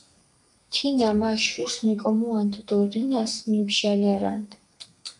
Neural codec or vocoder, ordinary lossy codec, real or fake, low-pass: codec, 32 kHz, 1.9 kbps, SNAC; MP3, 96 kbps; fake; 10.8 kHz